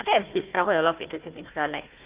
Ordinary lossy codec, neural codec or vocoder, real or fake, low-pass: Opus, 32 kbps; codec, 16 kHz, 1 kbps, FunCodec, trained on Chinese and English, 50 frames a second; fake; 3.6 kHz